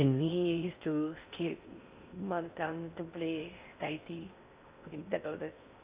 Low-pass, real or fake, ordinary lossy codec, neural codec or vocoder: 3.6 kHz; fake; Opus, 64 kbps; codec, 16 kHz in and 24 kHz out, 0.6 kbps, FocalCodec, streaming, 2048 codes